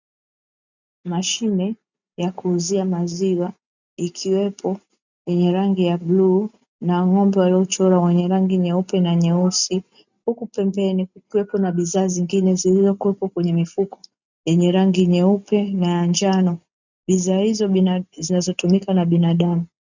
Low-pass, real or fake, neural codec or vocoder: 7.2 kHz; real; none